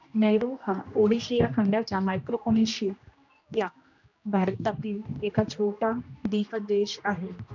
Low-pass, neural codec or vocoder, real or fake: 7.2 kHz; codec, 16 kHz, 1 kbps, X-Codec, HuBERT features, trained on general audio; fake